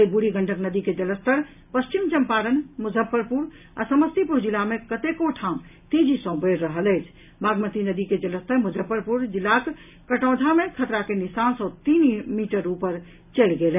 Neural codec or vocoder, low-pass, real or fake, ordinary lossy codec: none; 3.6 kHz; real; none